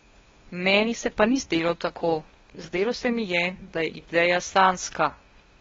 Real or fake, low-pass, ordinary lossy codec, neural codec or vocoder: fake; 7.2 kHz; AAC, 24 kbps; codec, 16 kHz, 2 kbps, FunCodec, trained on LibriTTS, 25 frames a second